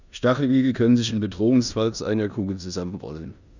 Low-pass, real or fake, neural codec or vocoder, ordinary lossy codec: 7.2 kHz; fake; codec, 16 kHz in and 24 kHz out, 0.9 kbps, LongCat-Audio-Codec, four codebook decoder; none